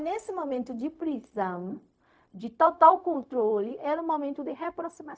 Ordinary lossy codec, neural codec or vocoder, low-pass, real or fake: none; codec, 16 kHz, 0.4 kbps, LongCat-Audio-Codec; none; fake